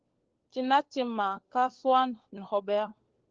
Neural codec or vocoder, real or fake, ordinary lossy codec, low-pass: codec, 16 kHz, 4 kbps, FunCodec, trained on LibriTTS, 50 frames a second; fake; Opus, 16 kbps; 7.2 kHz